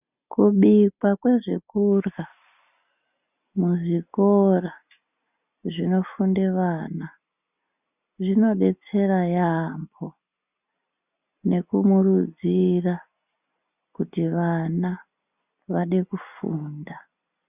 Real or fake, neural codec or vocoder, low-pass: real; none; 3.6 kHz